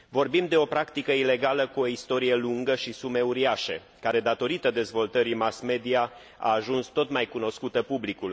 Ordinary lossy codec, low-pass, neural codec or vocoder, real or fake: none; none; none; real